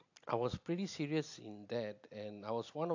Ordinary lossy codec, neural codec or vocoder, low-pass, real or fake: AAC, 48 kbps; none; 7.2 kHz; real